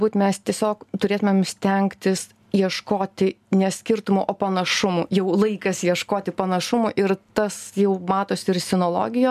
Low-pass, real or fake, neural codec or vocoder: 14.4 kHz; real; none